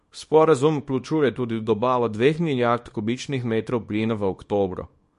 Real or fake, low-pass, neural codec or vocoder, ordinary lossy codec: fake; 10.8 kHz; codec, 24 kHz, 0.9 kbps, WavTokenizer, small release; MP3, 48 kbps